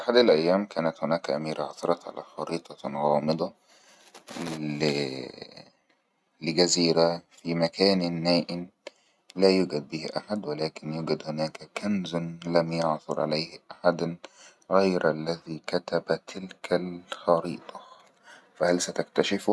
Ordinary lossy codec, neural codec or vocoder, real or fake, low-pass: none; none; real; none